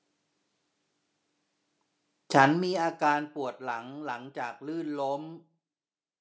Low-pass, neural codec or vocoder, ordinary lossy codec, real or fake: none; none; none; real